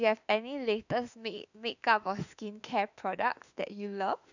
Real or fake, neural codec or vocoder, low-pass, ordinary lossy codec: fake; autoencoder, 48 kHz, 32 numbers a frame, DAC-VAE, trained on Japanese speech; 7.2 kHz; none